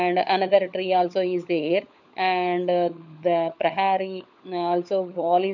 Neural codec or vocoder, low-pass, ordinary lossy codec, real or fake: codec, 16 kHz, 16 kbps, FunCodec, trained on LibriTTS, 50 frames a second; 7.2 kHz; none; fake